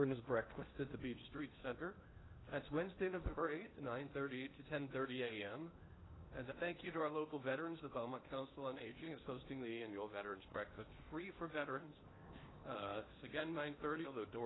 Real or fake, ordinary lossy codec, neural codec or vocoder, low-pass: fake; AAC, 16 kbps; codec, 16 kHz in and 24 kHz out, 0.8 kbps, FocalCodec, streaming, 65536 codes; 7.2 kHz